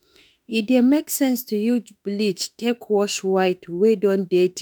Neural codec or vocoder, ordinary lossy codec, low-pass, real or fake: autoencoder, 48 kHz, 32 numbers a frame, DAC-VAE, trained on Japanese speech; none; none; fake